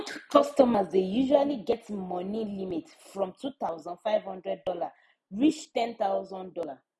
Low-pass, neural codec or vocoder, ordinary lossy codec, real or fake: none; none; none; real